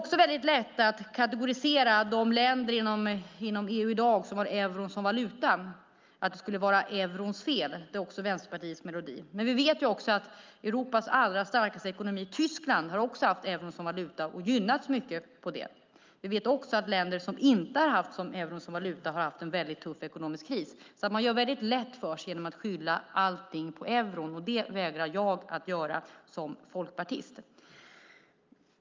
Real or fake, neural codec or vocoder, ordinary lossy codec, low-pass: real; none; Opus, 24 kbps; 7.2 kHz